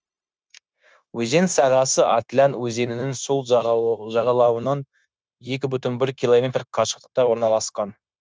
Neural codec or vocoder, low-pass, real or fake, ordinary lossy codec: codec, 16 kHz, 0.9 kbps, LongCat-Audio-Codec; none; fake; none